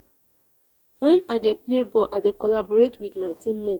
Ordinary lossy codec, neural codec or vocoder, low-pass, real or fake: none; codec, 44.1 kHz, 2.6 kbps, DAC; 19.8 kHz; fake